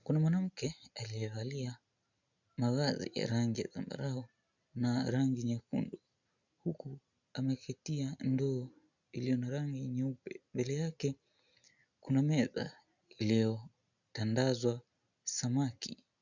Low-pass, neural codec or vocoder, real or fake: 7.2 kHz; none; real